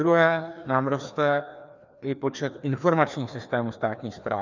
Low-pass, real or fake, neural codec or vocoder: 7.2 kHz; fake; codec, 16 kHz, 2 kbps, FreqCodec, larger model